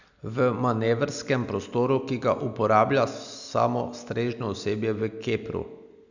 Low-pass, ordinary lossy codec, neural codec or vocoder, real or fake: 7.2 kHz; none; none; real